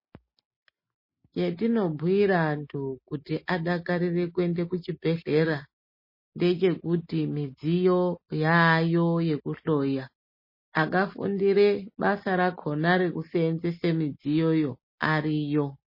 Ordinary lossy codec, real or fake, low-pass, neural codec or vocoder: MP3, 24 kbps; real; 5.4 kHz; none